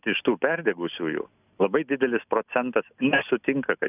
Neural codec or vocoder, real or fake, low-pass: none; real; 3.6 kHz